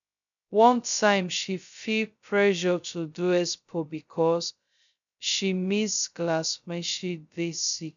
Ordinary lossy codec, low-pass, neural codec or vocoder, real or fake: none; 7.2 kHz; codec, 16 kHz, 0.2 kbps, FocalCodec; fake